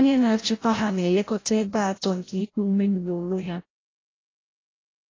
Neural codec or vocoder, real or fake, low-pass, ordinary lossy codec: codec, 16 kHz, 0.5 kbps, FreqCodec, larger model; fake; 7.2 kHz; AAC, 32 kbps